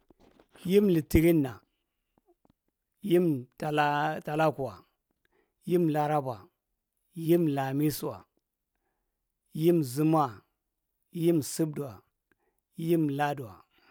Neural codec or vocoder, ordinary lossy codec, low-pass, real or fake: none; none; none; real